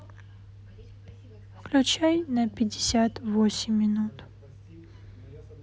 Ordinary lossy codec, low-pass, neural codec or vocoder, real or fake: none; none; none; real